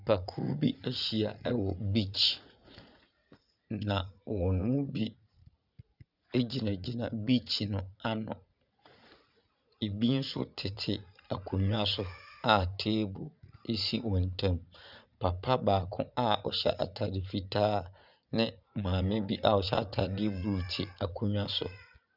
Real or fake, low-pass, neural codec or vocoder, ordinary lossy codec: fake; 5.4 kHz; vocoder, 44.1 kHz, 80 mel bands, Vocos; Opus, 64 kbps